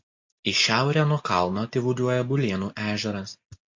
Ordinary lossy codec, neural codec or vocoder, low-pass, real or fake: MP3, 48 kbps; none; 7.2 kHz; real